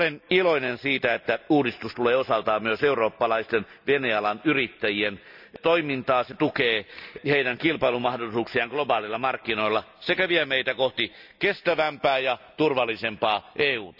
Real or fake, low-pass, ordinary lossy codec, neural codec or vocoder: real; 5.4 kHz; none; none